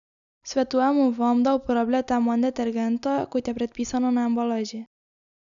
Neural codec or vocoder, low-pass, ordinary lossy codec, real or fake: none; 7.2 kHz; none; real